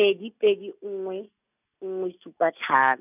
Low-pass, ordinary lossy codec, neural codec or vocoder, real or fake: 3.6 kHz; none; none; real